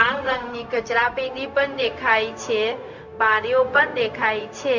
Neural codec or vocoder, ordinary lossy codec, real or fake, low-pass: codec, 16 kHz, 0.4 kbps, LongCat-Audio-Codec; none; fake; 7.2 kHz